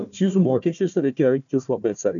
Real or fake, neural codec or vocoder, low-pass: fake; codec, 16 kHz, 1 kbps, FunCodec, trained on Chinese and English, 50 frames a second; 7.2 kHz